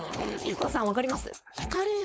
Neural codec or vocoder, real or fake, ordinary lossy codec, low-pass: codec, 16 kHz, 4.8 kbps, FACodec; fake; none; none